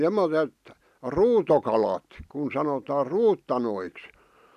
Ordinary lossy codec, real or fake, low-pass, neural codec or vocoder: none; real; 14.4 kHz; none